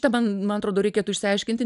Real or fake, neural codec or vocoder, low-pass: real; none; 10.8 kHz